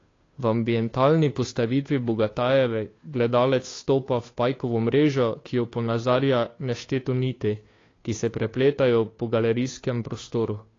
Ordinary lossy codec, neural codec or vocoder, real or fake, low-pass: AAC, 32 kbps; codec, 16 kHz, 2 kbps, FunCodec, trained on Chinese and English, 25 frames a second; fake; 7.2 kHz